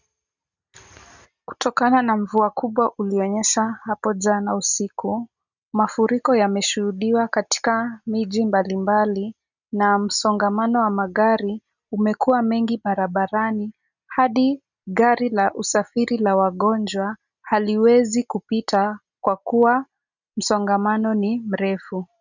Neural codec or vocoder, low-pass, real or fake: none; 7.2 kHz; real